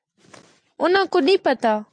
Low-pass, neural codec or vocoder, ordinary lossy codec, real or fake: 9.9 kHz; none; MP3, 64 kbps; real